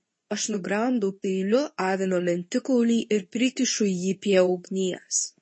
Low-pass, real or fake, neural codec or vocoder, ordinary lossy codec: 9.9 kHz; fake; codec, 24 kHz, 0.9 kbps, WavTokenizer, medium speech release version 2; MP3, 32 kbps